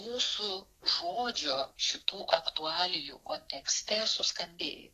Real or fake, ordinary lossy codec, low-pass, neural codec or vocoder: fake; AAC, 48 kbps; 14.4 kHz; codec, 32 kHz, 1.9 kbps, SNAC